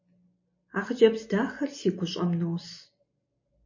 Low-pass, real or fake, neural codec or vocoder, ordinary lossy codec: 7.2 kHz; real; none; MP3, 32 kbps